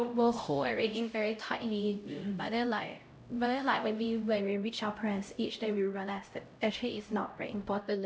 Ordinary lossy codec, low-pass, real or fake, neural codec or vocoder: none; none; fake; codec, 16 kHz, 0.5 kbps, X-Codec, HuBERT features, trained on LibriSpeech